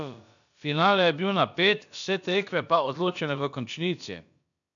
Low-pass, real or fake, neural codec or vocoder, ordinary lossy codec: 7.2 kHz; fake; codec, 16 kHz, about 1 kbps, DyCAST, with the encoder's durations; none